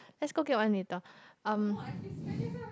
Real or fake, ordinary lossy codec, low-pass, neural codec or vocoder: real; none; none; none